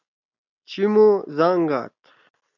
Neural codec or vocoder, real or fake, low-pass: none; real; 7.2 kHz